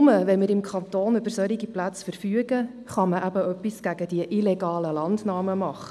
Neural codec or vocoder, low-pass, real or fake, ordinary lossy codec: none; none; real; none